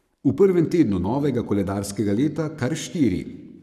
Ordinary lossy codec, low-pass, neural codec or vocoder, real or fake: none; 14.4 kHz; codec, 44.1 kHz, 7.8 kbps, Pupu-Codec; fake